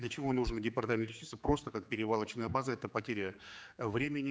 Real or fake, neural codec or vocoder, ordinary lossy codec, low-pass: fake; codec, 16 kHz, 4 kbps, X-Codec, HuBERT features, trained on general audio; none; none